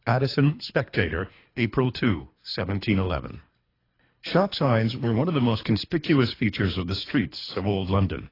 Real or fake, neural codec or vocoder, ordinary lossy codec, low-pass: fake; codec, 24 kHz, 3 kbps, HILCodec; AAC, 24 kbps; 5.4 kHz